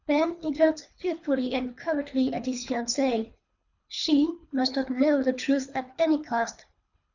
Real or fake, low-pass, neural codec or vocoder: fake; 7.2 kHz; codec, 24 kHz, 3 kbps, HILCodec